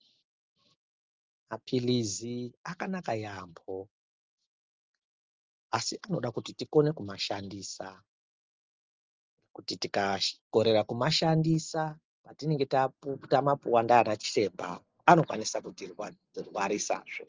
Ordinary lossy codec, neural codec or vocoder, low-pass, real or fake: Opus, 32 kbps; none; 7.2 kHz; real